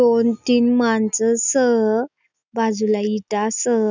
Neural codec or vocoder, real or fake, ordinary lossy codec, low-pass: none; real; none; none